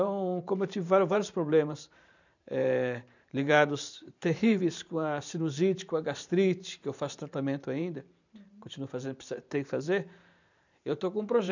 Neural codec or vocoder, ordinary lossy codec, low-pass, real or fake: vocoder, 44.1 kHz, 128 mel bands every 512 samples, BigVGAN v2; none; 7.2 kHz; fake